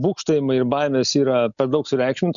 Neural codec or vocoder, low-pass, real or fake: none; 7.2 kHz; real